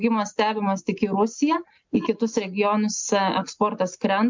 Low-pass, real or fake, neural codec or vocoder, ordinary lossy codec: 7.2 kHz; real; none; MP3, 64 kbps